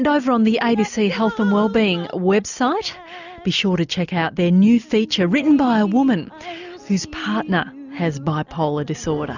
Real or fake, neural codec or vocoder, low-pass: real; none; 7.2 kHz